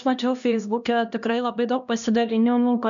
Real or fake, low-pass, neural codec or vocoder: fake; 7.2 kHz; codec, 16 kHz, 1 kbps, X-Codec, HuBERT features, trained on LibriSpeech